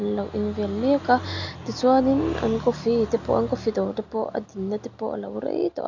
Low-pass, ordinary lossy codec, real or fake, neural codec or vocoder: 7.2 kHz; none; real; none